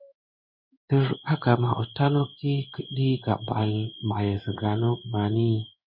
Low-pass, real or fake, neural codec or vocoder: 5.4 kHz; fake; codec, 16 kHz in and 24 kHz out, 1 kbps, XY-Tokenizer